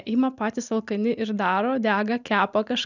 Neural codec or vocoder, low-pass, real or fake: none; 7.2 kHz; real